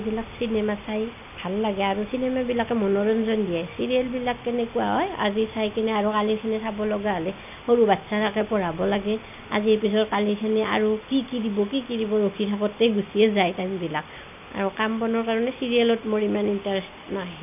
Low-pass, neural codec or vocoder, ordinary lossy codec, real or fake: 3.6 kHz; none; none; real